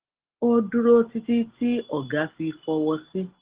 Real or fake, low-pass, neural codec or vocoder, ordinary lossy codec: real; 3.6 kHz; none; Opus, 16 kbps